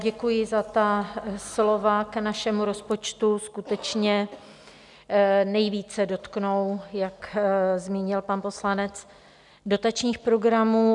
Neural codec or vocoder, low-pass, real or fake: none; 10.8 kHz; real